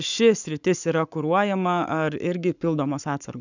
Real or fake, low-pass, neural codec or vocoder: fake; 7.2 kHz; codec, 44.1 kHz, 7.8 kbps, Pupu-Codec